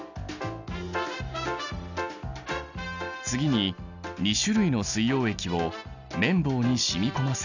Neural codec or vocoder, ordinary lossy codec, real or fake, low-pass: none; none; real; 7.2 kHz